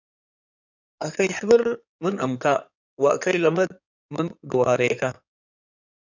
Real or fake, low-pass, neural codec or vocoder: fake; 7.2 kHz; codec, 16 kHz in and 24 kHz out, 2.2 kbps, FireRedTTS-2 codec